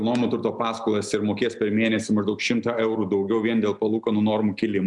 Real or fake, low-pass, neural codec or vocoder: real; 10.8 kHz; none